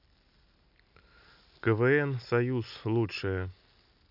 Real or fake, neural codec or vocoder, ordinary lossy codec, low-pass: real; none; none; 5.4 kHz